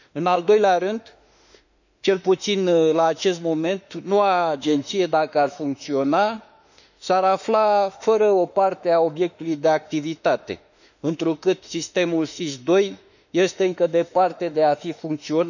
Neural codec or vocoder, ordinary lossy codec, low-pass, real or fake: autoencoder, 48 kHz, 32 numbers a frame, DAC-VAE, trained on Japanese speech; none; 7.2 kHz; fake